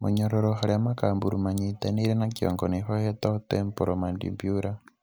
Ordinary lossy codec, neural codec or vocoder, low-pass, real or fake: none; none; none; real